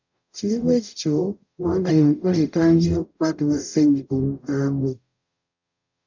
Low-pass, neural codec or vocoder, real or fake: 7.2 kHz; codec, 44.1 kHz, 0.9 kbps, DAC; fake